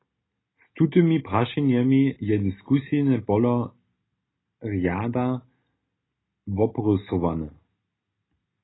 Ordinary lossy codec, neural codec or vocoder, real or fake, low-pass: AAC, 16 kbps; none; real; 7.2 kHz